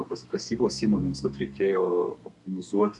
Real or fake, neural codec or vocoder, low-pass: fake; codec, 32 kHz, 1.9 kbps, SNAC; 10.8 kHz